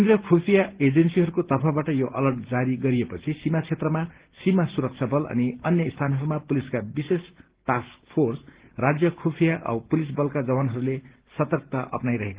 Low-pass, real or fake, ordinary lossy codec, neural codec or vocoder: 3.6 kHz; real; Opus, 16 kbps; none